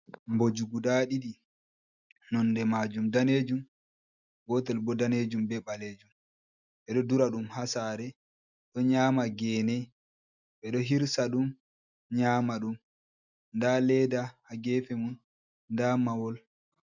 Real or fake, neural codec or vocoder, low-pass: real; none; 7.2 kHz